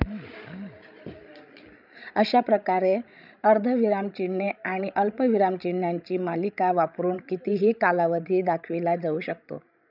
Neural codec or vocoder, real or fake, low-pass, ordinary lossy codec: codec, 16 kHz, 16 kbps, FreqCodec, larger model; fake; 5.4 kHz; none